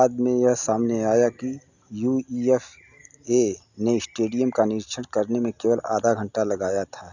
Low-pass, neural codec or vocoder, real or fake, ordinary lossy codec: 7.2 kHz; none; real; none